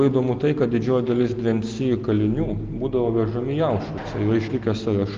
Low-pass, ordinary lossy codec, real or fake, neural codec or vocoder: 7.2 kHz; Opus, 32 kbps; real; none